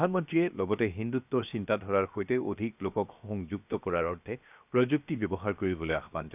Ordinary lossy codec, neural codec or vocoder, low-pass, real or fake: none; codec, 16 kHz, 0.7 kbps, FocalCodec; 3.6 kHz; fake